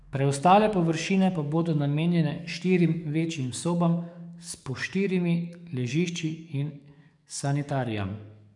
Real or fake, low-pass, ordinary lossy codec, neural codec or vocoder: fake; 10.8 kHz; none; codec, 44.1 kHz, 7.8 kbps, DAC